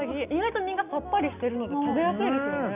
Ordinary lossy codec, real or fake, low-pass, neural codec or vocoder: none; real; 3.6 kHz; none